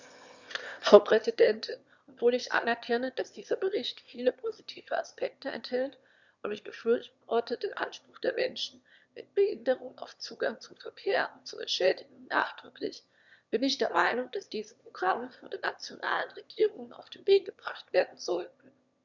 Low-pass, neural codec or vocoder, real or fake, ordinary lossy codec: 7.2 kHz; autoencoder, 22.05 kHz, a latent of 192 numbers a frame, VITS, trained on one speaker; fake; Opus, 64 kbps